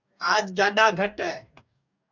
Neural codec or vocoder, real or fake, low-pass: codec, 44.1 kHz, 2.6 kbps, DAC; fake; 7.2 kHz